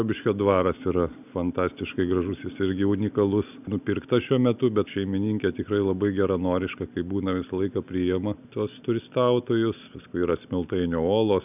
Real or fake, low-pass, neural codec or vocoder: real; 3.6 kHz; none